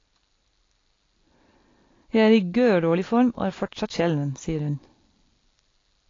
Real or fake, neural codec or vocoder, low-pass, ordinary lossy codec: real; none; 7.2 kHz; AAC, 32 kbps